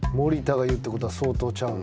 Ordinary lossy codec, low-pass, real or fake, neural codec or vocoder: none; none; real; none